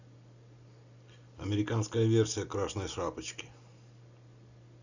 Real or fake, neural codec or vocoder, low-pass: real; none; 7.2 kHz